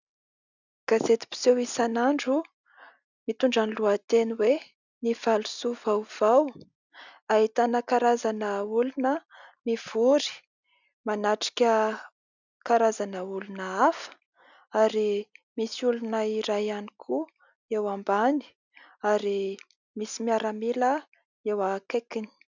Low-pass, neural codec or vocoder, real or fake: 7.2 kHz; none; real